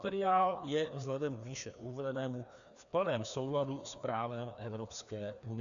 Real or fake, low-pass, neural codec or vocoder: fake; 7.2 kHz; codec, 16 kHz, 2 kbps, FreqCodec, larger model